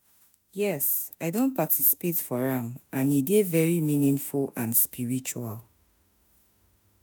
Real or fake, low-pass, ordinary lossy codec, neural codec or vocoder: fake; none; none; autoencoder, 48 kHz, 32 numbers a frame, DAC-VAE, trained on Japanese speech